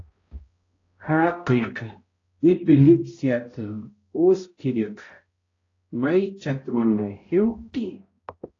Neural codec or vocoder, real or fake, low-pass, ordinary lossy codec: codec, 16 kHz, 0.5 kbps, X-Codec, HuBERT features, trained on balanced general audio; fake; 7.2 kHz; AAC, 32 kbps